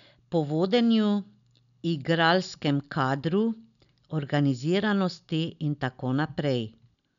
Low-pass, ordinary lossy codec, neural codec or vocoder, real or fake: 7.2 kHz; none; none; real